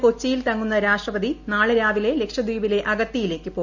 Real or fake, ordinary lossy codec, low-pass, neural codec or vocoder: real; none; 7.2 kHz; none